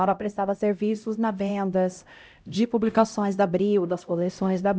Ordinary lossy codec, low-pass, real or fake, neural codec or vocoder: none; none; fake; codec, 16 kHz, 0.5 kbps, X-Codec, HuBERT features, trained on LibriSpeech